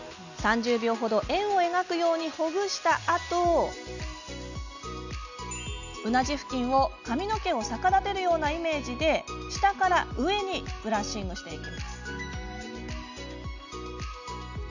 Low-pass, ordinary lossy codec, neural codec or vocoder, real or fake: 7.2 kHz; none; none; real